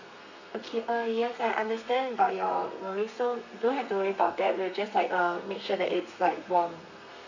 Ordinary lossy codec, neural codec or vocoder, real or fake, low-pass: none; codec, 32 kHz, 1.9 kbps, SNAC; fake; 7.2 kHz